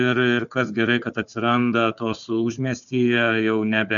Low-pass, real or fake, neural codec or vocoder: 7.2 kHz; fake; codec, 16 kHz, 4 kbps, FunCodec, trained on Chinese and English, 50 frames a second